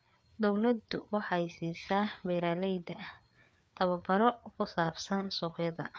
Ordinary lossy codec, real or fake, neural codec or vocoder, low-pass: none; fake; codec, 16 kHz, 4 kbps, FreqCodec, larger model; none